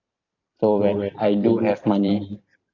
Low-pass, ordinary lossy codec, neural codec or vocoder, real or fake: 7.2 kHz; AAC, 48 kbps; vocoder, 44.1 kHz, 128 mel bands every 512 samples, BigVGAN v2; fake